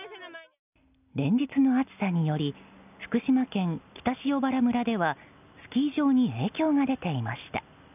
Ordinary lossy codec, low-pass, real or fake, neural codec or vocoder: none; 3.6 kHz; real; none